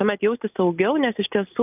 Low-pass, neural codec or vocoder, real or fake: 3.6 kHz; none; real